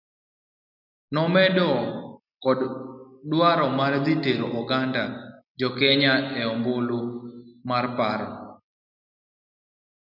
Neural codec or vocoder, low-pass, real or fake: none; 5.4 kHz; real